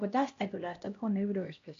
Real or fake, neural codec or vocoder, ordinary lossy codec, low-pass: fake; codec, 16 kHz, 1 kbps, X-Codec, HuBERT features, trained on LibriSpeech; AAC, 48 kbps; 7.2 kHz